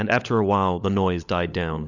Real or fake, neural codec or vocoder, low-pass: fake; codec, 16 kHz, 16 kbps, FunCodec, trained on LibriTTS, 50 frames a second; 7.2 kHz